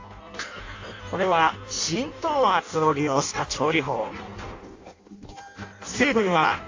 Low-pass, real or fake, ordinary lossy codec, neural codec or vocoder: 7.2 kHz; fake; AAC, 48 kbps; codec, 16 kHz in and 24 kHz out, 0.6 kbps, FireRedTTS-2 codec